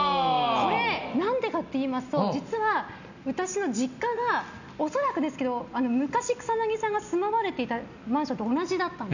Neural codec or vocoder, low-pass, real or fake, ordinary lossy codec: none; 7.2 kHz; real; none